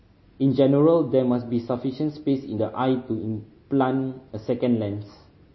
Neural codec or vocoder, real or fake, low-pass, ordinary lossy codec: none; real; 7.2 kHz; MP3, 24 kbps